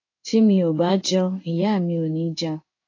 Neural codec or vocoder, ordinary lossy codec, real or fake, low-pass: codec, 16 kHz in and 24 kHz out, 1 kbps, XY-Tokenizer; AAC, 32 kbps; fake; 7.2 kHz